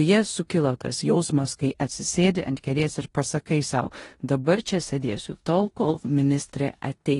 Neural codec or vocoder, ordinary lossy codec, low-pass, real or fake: codec, 16 kHz in and 24 kHz out, 0.9 kbps, LongCat-Audio-Codec, four codebook decoder; AAC, 32 kbps; 10.8 kHz; fake